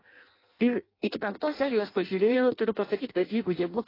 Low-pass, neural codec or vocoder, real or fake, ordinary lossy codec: 5.4 kHz; codec, 16 kHz in and 24 kHz out, 0.6 kbps, FireRedTTS-2 codec; fake; AAC, 24 kbps